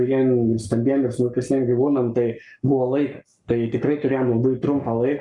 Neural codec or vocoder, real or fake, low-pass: codec, 44.1 kHz, 7.8 kbps, Pupu-Codec; fake; 10.8 kHz